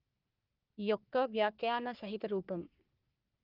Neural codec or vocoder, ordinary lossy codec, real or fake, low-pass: codec, 44.1 kHz, 3.4 kbps, Pupu-Codec; Opus, 24 kbps; fake; 5.4 kHz